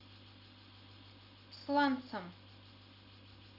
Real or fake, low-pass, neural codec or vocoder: real; 5.4 kHz; none